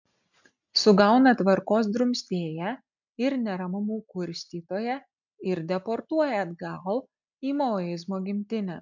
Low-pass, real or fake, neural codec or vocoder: 7.2 kHz; real; none